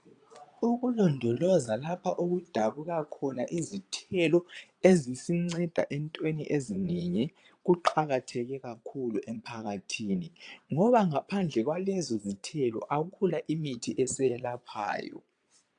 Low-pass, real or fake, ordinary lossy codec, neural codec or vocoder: 9.9 kHz; fake; AAC, 64 kbps; vocoder, 22.05 kHz, 80 mel bands, Vocos